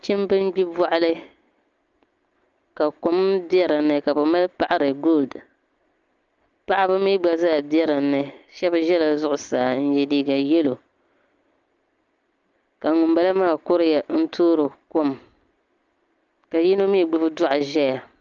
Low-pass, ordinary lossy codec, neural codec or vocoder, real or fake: 7.2 kHz; Opus, 24 kbps; none; real